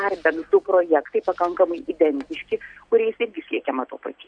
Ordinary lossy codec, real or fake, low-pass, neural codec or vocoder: MP3, 64 kbps; real; 9.9 kHz; none